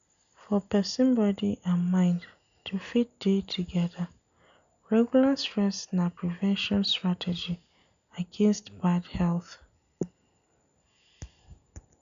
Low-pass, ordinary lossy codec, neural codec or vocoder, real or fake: 7.2 kHz; none; none; real